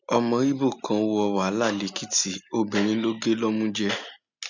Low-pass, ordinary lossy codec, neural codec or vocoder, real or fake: 7.2 kHz; none; none; real